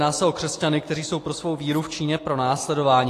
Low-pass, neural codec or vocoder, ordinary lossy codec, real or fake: 14.4 kHz; none; AAC, 48 kbps; real